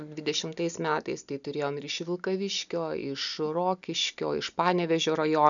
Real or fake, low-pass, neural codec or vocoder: real; 7.2 kHz; none